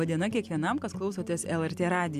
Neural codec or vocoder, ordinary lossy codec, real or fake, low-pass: none; MP3, 96 kbps; real; 14.4 kHz